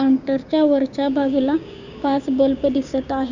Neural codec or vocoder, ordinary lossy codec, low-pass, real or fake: codec, 16 kHz, 6 kbps, DAC; none; 7.2 kHz; fake